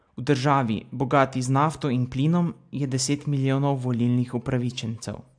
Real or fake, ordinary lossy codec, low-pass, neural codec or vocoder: real; AAC, 64 kbps; 9.9 kHz; none